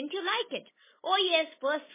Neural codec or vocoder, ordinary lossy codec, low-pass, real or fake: none; MP3, 16 kbps; 3.6 kHz; real